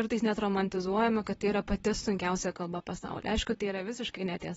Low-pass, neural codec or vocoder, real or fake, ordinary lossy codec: 19.8 kHz; vocoder, 44.1 kHz, 128 mel bands, Pupu-Vocoder; fake; AAC, 24 kbps